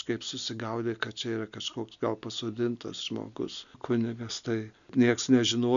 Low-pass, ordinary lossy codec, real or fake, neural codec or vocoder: 7.2 kHz; MP3, 96 kbps; real; none